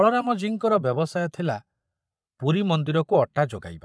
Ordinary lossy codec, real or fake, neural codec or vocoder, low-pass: none; fake; vocoder, 22.05 kHz, 80 mel bands, Vocos; none